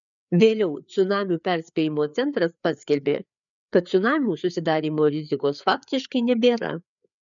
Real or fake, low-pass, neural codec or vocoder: fake; 7.2 kHz; codec, 16 kHz, 4 kbps, FreqCodec, larger model